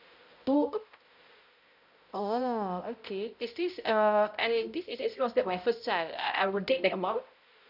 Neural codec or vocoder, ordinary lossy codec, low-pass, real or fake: codec, 16 kHz, 0.5 kbps, X-Codec, HuBERT features, trained on balanced general audio; none; 5.4 kHz; fake